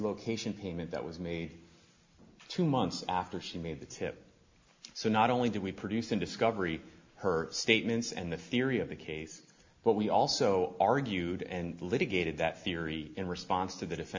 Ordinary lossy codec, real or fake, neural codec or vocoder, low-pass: MP3, 64 kbps; real; none; 7.2 kHz